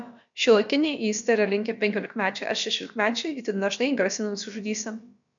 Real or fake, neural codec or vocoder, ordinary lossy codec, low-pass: fake; codec, 16 kHz, about 1 kbps, DyCAST, with the encoder's durations; MP3, 64 kbps; 7.2 kHz